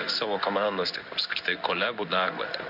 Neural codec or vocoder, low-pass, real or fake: codec, 16 kHz in and 24 kHz out, 1 kbps, XY-Tokenizer; 5.4 kHz; fake